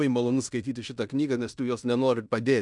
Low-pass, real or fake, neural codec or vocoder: 10.8 kHz; fake; codec, 16 kHz in and 24 kHz out, 0.9 kbps, LongCat-Audio-Codec, fine tuned four codebook decoder